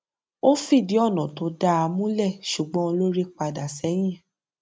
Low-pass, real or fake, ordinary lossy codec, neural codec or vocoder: none; real; none; none